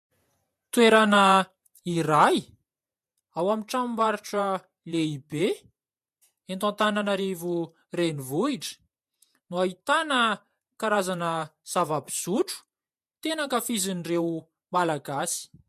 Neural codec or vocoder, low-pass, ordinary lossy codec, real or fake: vocoder, 48 kHz, 128 mel bands, Vocos; 14.4 kHz; MP3, 64 kbps; fake